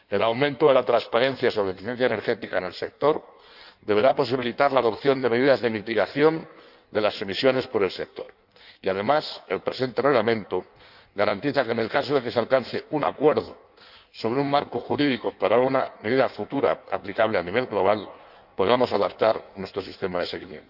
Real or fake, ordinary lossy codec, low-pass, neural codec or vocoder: fake; none; 5.4 kHz; codec, 16 kHz in and 24 kHz out, 1.1 kbps, FireRedTTS-2 codec